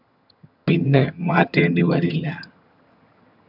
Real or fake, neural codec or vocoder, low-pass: fake; vocoder, 22.05 kHz, 80 mel bands, HiFi-GAN; 5.4 kHz